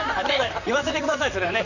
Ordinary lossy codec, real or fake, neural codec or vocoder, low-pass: none; fake; vocoder, 44.1 kHz, 128 mel bands, Pupu-Vocoder; 7.2 kHz